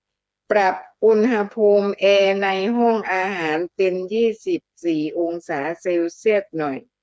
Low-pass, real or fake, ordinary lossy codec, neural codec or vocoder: none; fake; none; codec, 16 kHz, 4 kbps, FreqCodec, smaller model